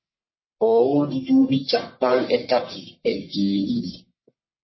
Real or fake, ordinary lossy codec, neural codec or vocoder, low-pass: fake; MP3, 24 kbps; codec, 44.1 kHz, 1.7 kbps, Pupu-Codec; 7.2 kHz